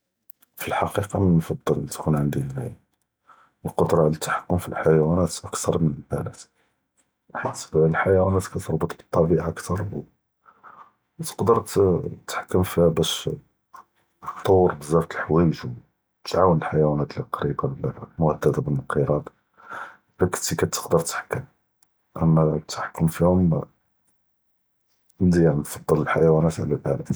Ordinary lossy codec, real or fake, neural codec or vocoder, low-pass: none; real; none; none